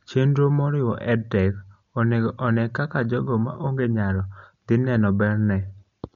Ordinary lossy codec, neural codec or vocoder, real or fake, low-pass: MP3, 48 kbps; none; real; 7.2 kHz